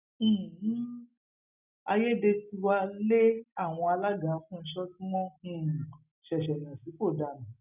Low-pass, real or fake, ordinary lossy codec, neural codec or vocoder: 3.6 kHz; real; none; none